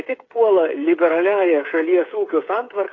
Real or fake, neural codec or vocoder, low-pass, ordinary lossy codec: fake; codec, 16 kHz, 8 kbps, FreqCodec, smaller model; 7.2 kHz; AAC, 32 kbps